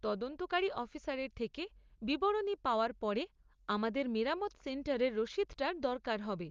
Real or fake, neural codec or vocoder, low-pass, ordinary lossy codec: real; none; 7.2 kHz; Opus, 32 kbps